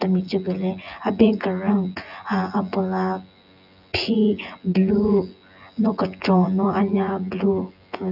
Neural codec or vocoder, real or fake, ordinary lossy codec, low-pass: vocoder, 24 kHz, 100 mel bands, Vocos; fake; none; 5.4 kHz